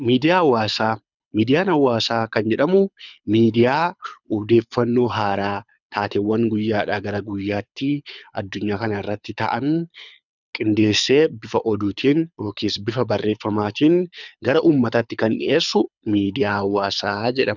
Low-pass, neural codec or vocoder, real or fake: 7.2 kHz; codec, 24 kHz, 6 kbps, HILCodec; fake